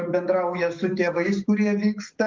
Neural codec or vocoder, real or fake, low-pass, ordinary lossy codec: codec, 44.1 kHz, 7.8 kbps, DAC; fake; 7.2 kHz; Opus, 32 kbps